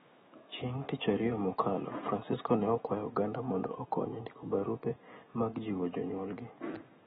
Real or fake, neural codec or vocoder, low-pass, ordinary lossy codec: fake; autoencoder, 48 kHz, 128 numbers a frame, DAC-VAE, trained on Japanese speech; 19.8 kHz; AAC, 16 kbps